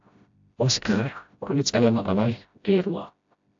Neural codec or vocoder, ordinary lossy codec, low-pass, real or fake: codec, 16 kHz, 0.5 kbps, FreqCodec, smaller model; AAC, 64 kbps; 7.2 kHz; fake